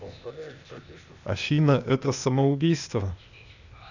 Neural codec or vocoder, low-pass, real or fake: codec, 16 kHz, 0.8 kbps, ZipCodec; 7.2 kHz; fake